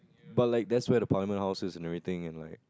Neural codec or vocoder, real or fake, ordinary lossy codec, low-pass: none; real; none; none